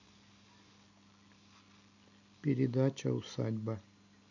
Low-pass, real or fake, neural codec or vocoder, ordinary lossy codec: 7.2 kHz; real; none; none